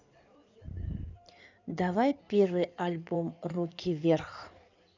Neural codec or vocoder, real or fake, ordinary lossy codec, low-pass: codec, 16 kHz in and 24 kHz out, 2.2 kbps, FireRedTTS-2 codec; fake; none; 7.2 kHz